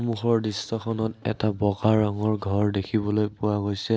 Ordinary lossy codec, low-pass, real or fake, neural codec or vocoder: none; none; real; none